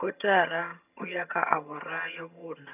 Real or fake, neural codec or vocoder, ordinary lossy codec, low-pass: fake; vocoder, 22.05 kHz, 80 mel bands, HiFi-GAN; none; 3.6 kHz